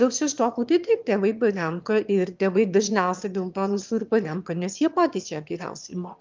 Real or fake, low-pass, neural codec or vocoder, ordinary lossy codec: fake; 7.2 kHz; autoencoder, 22.05 kHz, a latent of 192 numbers a frame, VITS, trained on one speaker; Opus, 24 kbps